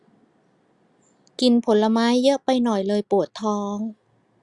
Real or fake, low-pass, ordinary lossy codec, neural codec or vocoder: real; 10.8 kHz; Opus, 64 kbps; none